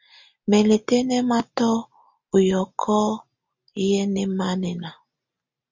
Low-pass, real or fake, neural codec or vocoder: 7.2 kHz; real; none